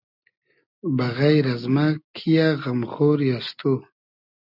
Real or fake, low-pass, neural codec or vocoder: real; 5.4 kHz; none